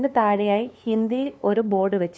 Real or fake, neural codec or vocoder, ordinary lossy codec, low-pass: fake; codec, 16 kHz, 2 kbps, FunCodec, trained on LibriTTS, 25 frames a second; none; none